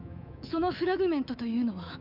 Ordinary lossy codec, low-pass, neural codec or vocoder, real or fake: MP3, 48 kbps; 5.4 kHz; codec, 24 kHz, 3.1 kbps, DualCodec; fake